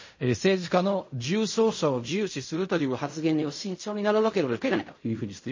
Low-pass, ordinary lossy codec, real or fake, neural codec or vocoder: 7.2 kHz; MP3, 32 kbps; fake; codec, 16 kHz in and 24 kHz out, 0.4 kbps, LongCat-Audio-Codec, fine tuned four codebook decoder